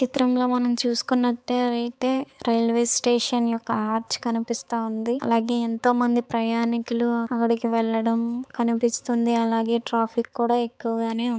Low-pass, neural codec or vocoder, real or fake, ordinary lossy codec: none; codec, 16 kHz, 4 kbps, X-Codec, HuBERT features, trained on balanced general audio; fake; none